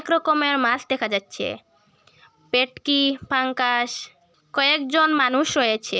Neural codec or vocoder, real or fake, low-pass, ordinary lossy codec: none; real; none; none